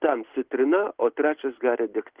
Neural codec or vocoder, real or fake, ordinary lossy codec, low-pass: none; real; Opus, 16 kbps; 3.6 kHz